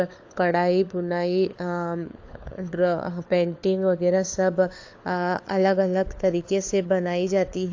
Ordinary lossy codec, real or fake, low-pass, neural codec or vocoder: AAC, 48 kbps; fake; 7.2 kHz; codec, 16 kHz, 4 kbps, FunCodec, trained on LibriTTS, 50 frames a second